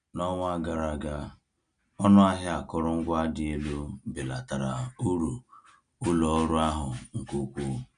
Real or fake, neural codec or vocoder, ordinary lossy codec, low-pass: real; none; none; 9.9 kHz